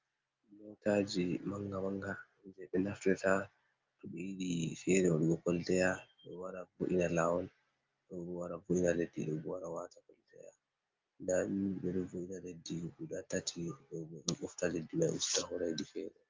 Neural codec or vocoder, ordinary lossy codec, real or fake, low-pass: none; Opus, 24 kbps; real; 7.2 kHz